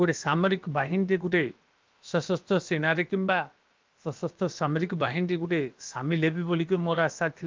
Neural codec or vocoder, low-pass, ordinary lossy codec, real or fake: codec, 16 kHz, about 1 kbps, DyCAST, with the encoder's durations; 7.2 kHz; Opus, 24 kbps; fake